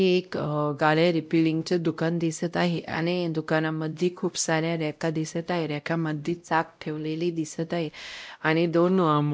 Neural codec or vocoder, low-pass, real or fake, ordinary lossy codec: codec, 16 kHz, 0.5 kbps, X-Codec, WavLM features, trained on Multilingual LibriSpeech; none; fake; none